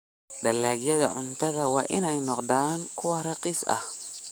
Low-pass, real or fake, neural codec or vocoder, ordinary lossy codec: none; fake; codec, 44.1 kHz, 7.8 kbps, DAC; none